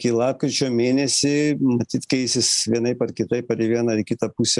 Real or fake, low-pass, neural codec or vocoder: real; 10.8 kHz; none